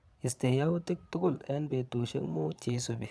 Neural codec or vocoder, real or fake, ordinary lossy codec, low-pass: vocoder, 22.05 kHz, 80 mel bands, Vocos; fake; none; none